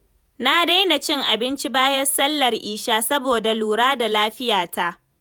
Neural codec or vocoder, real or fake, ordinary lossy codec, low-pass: vocoder, 48 kHz, 128 mel bands, Vocos; fake; none; none